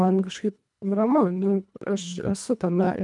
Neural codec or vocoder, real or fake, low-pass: codec, 24 kHz, 1.5 kbps, HILCodec; fake; 10.8 kHz